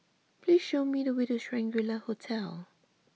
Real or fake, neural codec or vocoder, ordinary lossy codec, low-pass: real; none; none; none